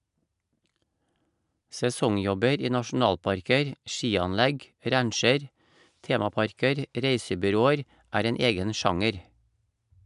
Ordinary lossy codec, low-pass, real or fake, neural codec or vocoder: none; 10.8 kHz; real; none